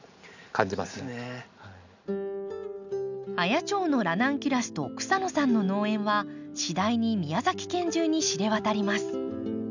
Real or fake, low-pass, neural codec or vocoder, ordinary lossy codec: real; 7.2 kHz; none; none